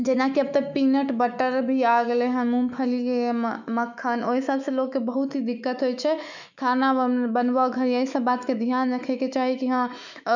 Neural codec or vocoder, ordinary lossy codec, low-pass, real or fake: autoencoder, 48 kHz, 128 numbers a frame, DAC-VAE, trained on Japanese speech; none; 7.2 kHz; fake